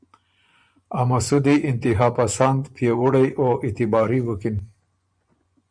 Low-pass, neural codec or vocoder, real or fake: 9.9 kHz; vocoder, 24 kHz, 100 mel bands, Vocos; fake